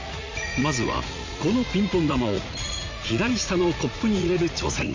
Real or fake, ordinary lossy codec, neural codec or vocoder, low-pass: fake; MP3, 64 kbps; vocoder, 44.1 kHz, 80 mel bands, Vocos; 7.2 kHz